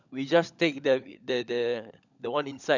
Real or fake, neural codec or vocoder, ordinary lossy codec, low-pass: fake; codec, 16 kHz, 16 kbps, FunCodec, trained on LibriTTS, 50 frames a second; none; 7.2 kHz